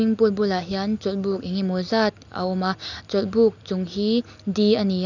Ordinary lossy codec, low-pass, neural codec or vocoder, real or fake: none; 7.2 kHz; none; real